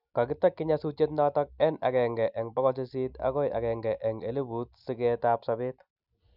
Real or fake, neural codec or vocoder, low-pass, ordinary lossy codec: real; none; 5.4 kHz; none